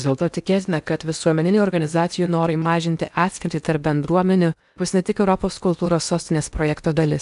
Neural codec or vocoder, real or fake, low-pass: codec, 16 kHz in and 24 kHz out, 0.8 kbps, FocalCodec, streaming, 65536 codes; fake; 10.8 kHz